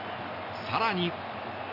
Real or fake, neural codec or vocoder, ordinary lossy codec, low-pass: real; none; none; 5.4 kHz